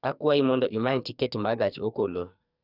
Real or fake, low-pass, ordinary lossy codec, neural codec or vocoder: fake; 5.4 kHz; none; codec, 44.1 kHz, 2.6 kbps, SNAC